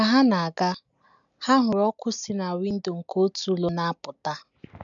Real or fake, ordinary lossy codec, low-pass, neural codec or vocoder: real; none; 7.2 kHz; none